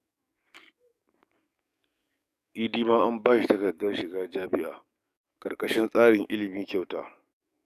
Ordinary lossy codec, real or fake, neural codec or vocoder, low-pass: none; fake; codec, 44.1 kHz, 7.8 kbps, DAC; 14.4 kHz